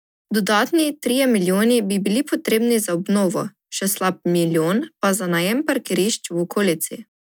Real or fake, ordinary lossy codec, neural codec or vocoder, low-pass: real; none; none; none